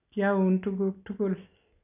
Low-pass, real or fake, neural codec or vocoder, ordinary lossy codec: 3.6 kHz; real; none; none